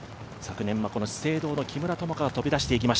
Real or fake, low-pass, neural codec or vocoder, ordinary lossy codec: real; none; none; none